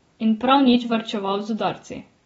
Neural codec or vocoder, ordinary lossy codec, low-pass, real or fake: none; AAC, 24 kbps; 19.8 kHz; real